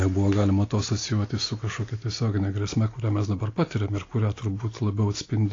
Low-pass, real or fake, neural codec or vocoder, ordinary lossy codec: 7.2 kHz; real; none; AAC, 32 kbps